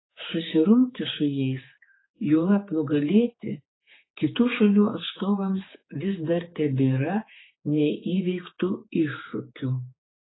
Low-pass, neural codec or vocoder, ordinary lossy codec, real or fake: 7.2 kHz; codec, 16 kHz, 4 kbps, X-Codec, HuBERT features, trained on general audio; AAC, 16 kbps; fake